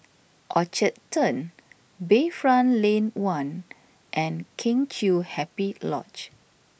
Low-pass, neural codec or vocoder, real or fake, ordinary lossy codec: none; none; real; none